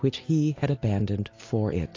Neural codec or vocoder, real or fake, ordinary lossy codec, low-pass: none; real; AAC, 32 kbps; 7.2 kHz